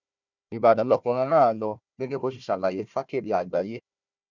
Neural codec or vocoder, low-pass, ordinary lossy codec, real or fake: codec, 16 kHz, 1 kbps, FunCodec, trained on Chinese and English, 50 frames a second; 7.2 kHz; none; fake